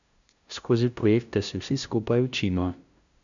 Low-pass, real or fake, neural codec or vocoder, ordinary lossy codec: 7.2 kHz; fake; codec, 16 kHz, 0.5 kbps, FunCodec, trained on LibriTTS, 25 frames a second; MP3, 96 kbps